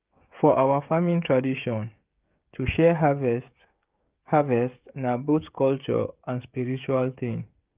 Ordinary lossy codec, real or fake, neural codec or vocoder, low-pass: Opus, 24 kbps; fake; codec, 16 kHz, 16 kbps, FreqCodec, smaller model; 3.6 kHz